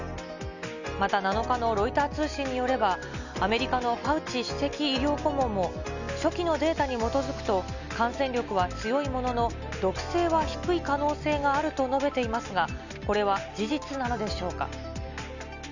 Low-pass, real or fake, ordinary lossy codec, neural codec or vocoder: 7.2 kHz; real; none; none